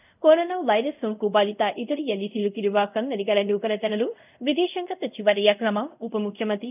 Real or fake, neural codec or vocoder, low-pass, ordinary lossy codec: fake; codec, 24 kHz, 0.5 kbps, DualCodec; 3.6 kHz; none